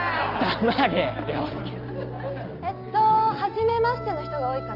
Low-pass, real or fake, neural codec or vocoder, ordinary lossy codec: 5.4 kHz; real; none; Opus, 32 kbps